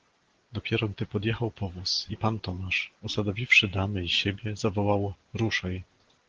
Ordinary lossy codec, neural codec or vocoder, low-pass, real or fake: Opus, 16 kbps; none; 7.2 kHz; real